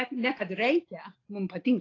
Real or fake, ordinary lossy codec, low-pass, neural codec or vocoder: real; AAC, 32 kbps; 7.2 kHz; none